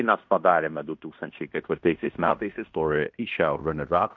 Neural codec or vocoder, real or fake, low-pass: codec, 16 kHz in and 24 kHz out, 0.9 kbps, LongCat-Audio-Codec, fine tuned four codebook decoder; fake; 7.2 kHz